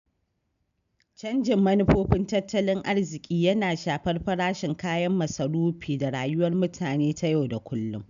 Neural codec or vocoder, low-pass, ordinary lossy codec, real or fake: none; 7.2 kHz; none; real